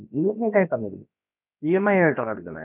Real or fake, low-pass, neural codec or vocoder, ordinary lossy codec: fake; 3.6 kHz; codec, 16 kHz, about 1 kbps, DyCAST, with the encoder's durations; none